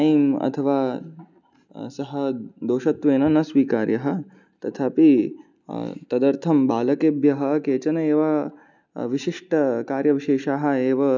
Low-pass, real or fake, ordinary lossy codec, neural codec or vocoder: 7.2 kHz; real; none; none